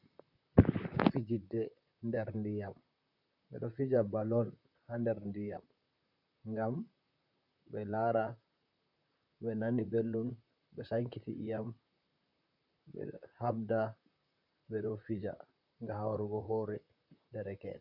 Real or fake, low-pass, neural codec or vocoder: fake; 5.4 kHz; vocoder, 44.1 kHz, 128 mel bands, Pupu-Vocoder